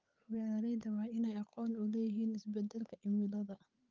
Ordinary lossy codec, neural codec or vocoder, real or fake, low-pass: Opus, 24 kbps; codec, 16 kHz, 8 kbps, FunCodec, trained on Chinese and English, 25 frames a second; fake; 7.2 kHz